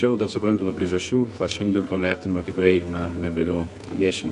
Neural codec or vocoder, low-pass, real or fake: codec, 24 kHz, 0.9 kbps, WavTokenizer, medium music audio release; 10.8 kHz; fake